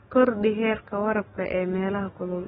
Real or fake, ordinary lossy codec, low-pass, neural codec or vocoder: fake; AAC, 16 kbps; 19.8 kHz; codec, 44.1 kHz, 7.8 kbps, DAC